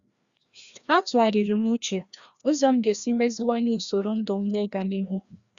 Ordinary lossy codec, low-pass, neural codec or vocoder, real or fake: Opus, 64 kbps; 7.2 kHz; codec, 16 kHz, 1 kbps, FreqCodec, larger model; fake